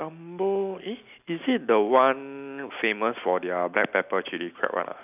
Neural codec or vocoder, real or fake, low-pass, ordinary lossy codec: none; real; 3.6 kHz; none